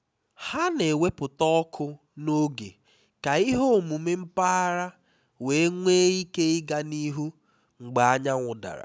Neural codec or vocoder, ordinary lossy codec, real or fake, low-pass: none; none; real; none